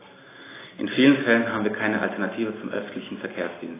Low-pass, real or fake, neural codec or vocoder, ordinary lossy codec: 3.6 kHz; real; none; AAC, 16 kbps